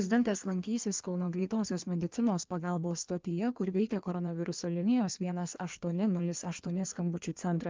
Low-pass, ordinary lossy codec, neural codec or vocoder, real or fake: 7.2 kHz; Opus, 32 kbps; codec, 16 kHz in and 24 kHz out, 1.1 kbps, FireRedTTS-2 codec; fake